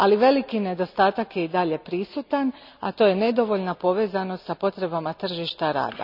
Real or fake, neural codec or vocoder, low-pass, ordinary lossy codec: real; none; 5.4 kHz; none